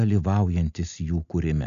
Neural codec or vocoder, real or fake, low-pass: none; real; 7.2 kHz